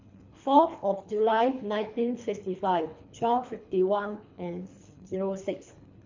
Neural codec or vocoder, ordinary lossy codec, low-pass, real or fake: codec, 24 kHz, 3 kbps, HILCodec; MP3, 48 kbps; 7.2 kHz; fake